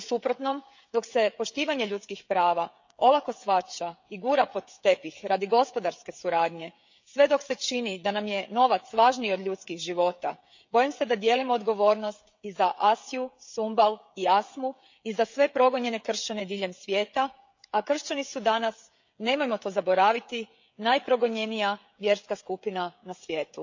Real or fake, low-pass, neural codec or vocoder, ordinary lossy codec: fake; 7.2 kHz; codec, 16 kHz, 16 kbps, FreqCodec, smaller model; MP3, 64 kbps